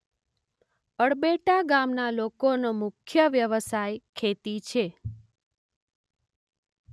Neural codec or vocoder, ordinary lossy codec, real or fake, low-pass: none; none; real; none